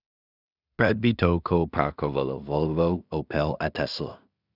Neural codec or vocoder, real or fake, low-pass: codec, 16 kHz in and 24 kHz out, 0.4 kbps, LongCat-Audio-Codec, two codebook decoder; fake; 5.4 kHz